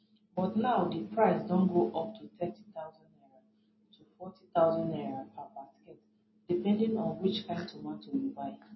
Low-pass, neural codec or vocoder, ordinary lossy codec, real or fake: 7.2 kHz; none; MP3, 24 kbps; real